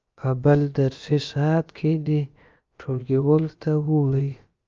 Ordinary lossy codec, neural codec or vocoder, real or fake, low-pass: Opus, 24 kbps; codec, 16 kHz, about 1 kbps, DyCAST, with the encoder's durations; fake; 7.2 kHz